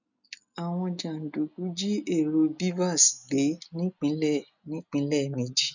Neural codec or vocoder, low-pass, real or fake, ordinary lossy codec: none; 7.2 kHz; real; none